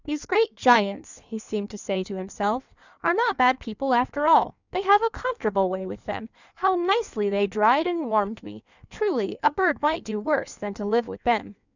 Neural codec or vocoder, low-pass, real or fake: codec, 16 kHz in and 24 kHz out, 1.1 kbps, FireRedTTS-2 codec; 7.2 kHz; fake